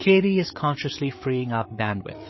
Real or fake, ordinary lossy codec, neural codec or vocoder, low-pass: fake; MP3, 24 kbps; codec, 16 kHz, 8 kbps, FreqCodec, larger model; 7.2 kHz